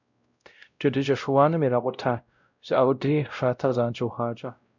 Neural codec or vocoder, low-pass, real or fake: codec, 16 kHz, 0.5 kbps, X-Codec, WavLM features, trained on Multilingual LibriSpeech; 7.2 kHz; fake